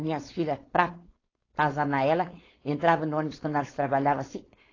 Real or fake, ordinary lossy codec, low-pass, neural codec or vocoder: fake; AAC, 32 kbps; 7.2 kHz; codec, 16 kHz, 4.8 kbps, FACodec